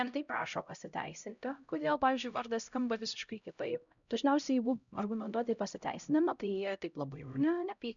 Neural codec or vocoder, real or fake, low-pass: codec, 16 kHz, 0.5 kbps, X-Codec, HuBERT features, trained on LibriSpeech; fake; 7.2 kHz